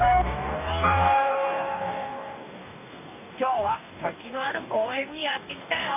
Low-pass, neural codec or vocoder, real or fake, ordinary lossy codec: 3.6 kHz; codec, 44.1 kHz, 2.6 kbps, DAC; fake; none